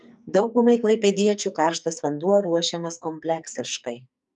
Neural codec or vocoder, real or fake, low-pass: codec, 44.1 kHz, 2.6 kbps, SNAC; fake; 10.8 kHz